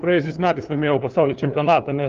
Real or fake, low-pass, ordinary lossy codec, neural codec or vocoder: fake; 7.2 kHz; Opus, 32 kbps; codec, 16 kHz, 2 kbps, FunCodec, trained on LibriTTS, 25 frames a second